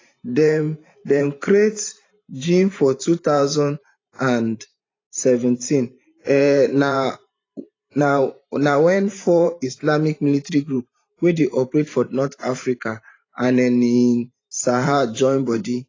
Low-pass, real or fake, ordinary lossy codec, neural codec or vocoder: 7.2 kHz; fake; AAC, 32 kbps; vocoder, 44.1 kHz, 128 mel bands every 512 samples, BigVGAN v2